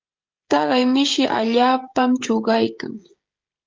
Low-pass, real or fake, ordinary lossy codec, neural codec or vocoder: 7.2 kHz; fake; Opus, 32 kbps; codec, 16 kHz, 16 kbps, FreqCodec, smaller model